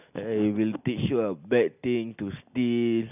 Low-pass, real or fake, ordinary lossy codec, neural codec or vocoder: 3.6 kHz; real; none; none